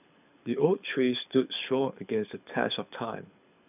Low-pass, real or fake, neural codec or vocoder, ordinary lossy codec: 3.6 kHz; fake; codec, 24 kHz, 6 kbps, HILCodec; none